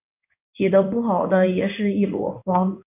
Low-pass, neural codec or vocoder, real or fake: 3.6 kHz; codec, 16 kHz in and 24 kHz out, 1 kbps, XY-Tokenizer; fake